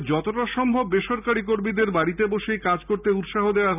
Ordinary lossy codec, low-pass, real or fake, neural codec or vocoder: none; 3.6 kHz; real; none